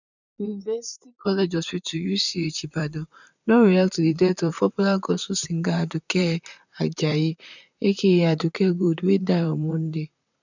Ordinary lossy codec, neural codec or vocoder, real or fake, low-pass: none; vocoder, 44.1 kHz, 128 mel bands, Pupu-Vocoder; fake; 7.2 kHz